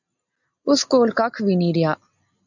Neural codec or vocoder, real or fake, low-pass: none; real; 7.2 kHz